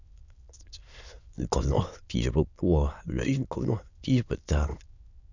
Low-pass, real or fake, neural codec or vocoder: 7.2 kHz; fake; autoencoder, 22.05 kHz, a latent of 192 numbers a frame, VITS, trained on many speakers